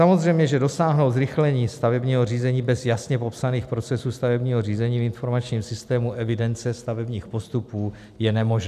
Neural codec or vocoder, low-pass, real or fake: none; 14.4 kHz; real